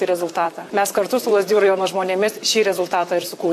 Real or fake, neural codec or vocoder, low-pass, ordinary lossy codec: fake; vocoder, 44.1 kHz, 128 mel bands, Pupu-Vocoder; 14.4 kHz; AAC, 64 kbps